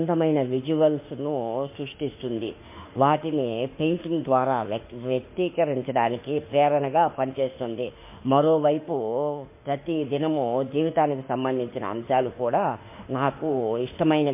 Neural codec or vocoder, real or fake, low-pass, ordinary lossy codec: autoencoder, 48 kHz, 32 numbers a frame, DAC-VAE, trained on Japanese speech; fake; 3.6 kHz; MP3, 24 kbps